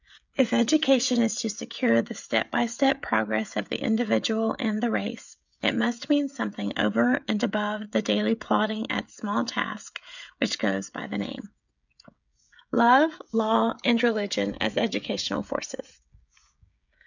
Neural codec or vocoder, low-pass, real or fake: codec, 16 kHz, 16 kbps, FreqCodec, smaller model; 7.2 kHz; fake